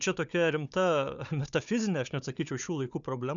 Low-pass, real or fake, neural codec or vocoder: 7.2 kHz; fake; codec, 16 kHz, 4 kbps, FunCodec, trained on Chinese and English, 50 frames a second